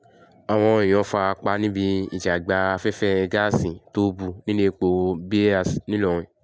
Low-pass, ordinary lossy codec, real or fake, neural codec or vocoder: none; none; real; none